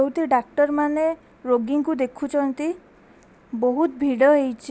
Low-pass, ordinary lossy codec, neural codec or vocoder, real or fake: none; none; none; real